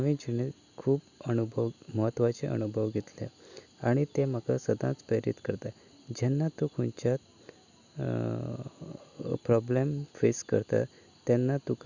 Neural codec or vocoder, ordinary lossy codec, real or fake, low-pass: none; none; real; 7.2 kHz